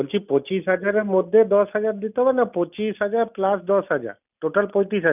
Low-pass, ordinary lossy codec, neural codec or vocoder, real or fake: 3.6 kHz; none; none; real